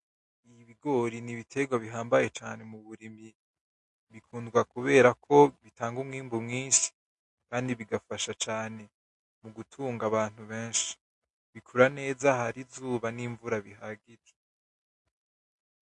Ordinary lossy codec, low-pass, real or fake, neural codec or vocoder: MP3, 48 kbps; 10.8 kHz; real; none